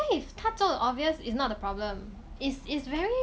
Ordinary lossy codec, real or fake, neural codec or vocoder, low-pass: none; real; none; none